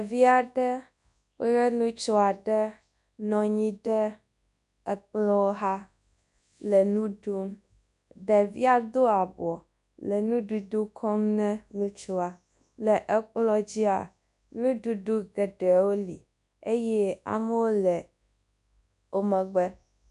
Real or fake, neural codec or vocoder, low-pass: fake; codec, 24 kHz, 0.9 kbps, WavTokenizer, large speech release; 10.8 kHz